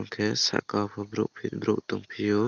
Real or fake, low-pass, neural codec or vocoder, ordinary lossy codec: real; 7.2 kHz; none; Opus, 32 kbps